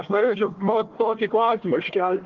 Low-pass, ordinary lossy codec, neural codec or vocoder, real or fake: 7.2 kHz; Opus, 16 kbps; codec, 16 kHz, 1 kbps, FunCodec, trained on Chinese and English, 50 frames a second; fake